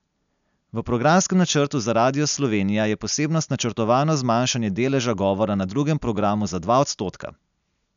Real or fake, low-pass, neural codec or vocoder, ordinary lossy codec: real; 7.2 kHz; none; none